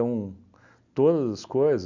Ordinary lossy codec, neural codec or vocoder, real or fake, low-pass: none; none; real; 7.2 kHz